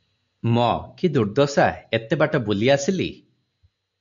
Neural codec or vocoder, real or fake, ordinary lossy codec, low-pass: none; real; MP3, 64 kbps; 7.2 kHz